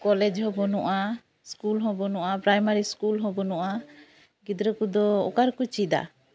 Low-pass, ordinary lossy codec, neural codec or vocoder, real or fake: none; none; none; real